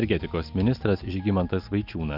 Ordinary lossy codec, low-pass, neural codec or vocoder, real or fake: Opus, 24 kbps; 5.4 kHz; none; real